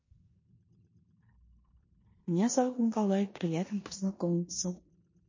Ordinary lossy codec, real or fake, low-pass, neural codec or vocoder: MP3, 32 kbps; fake; 7.2 kHz; codec, 16 kHz in and 24 kHz out, 0.9 kbps, LongCat-Audio-Codec, four codebook decoder